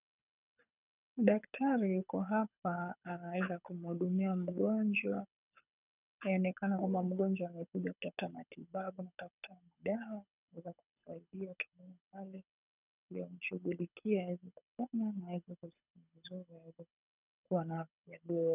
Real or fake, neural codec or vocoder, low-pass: fake; codec, 24 kHz, 6 kbps, HILCodec; 3.6 kHz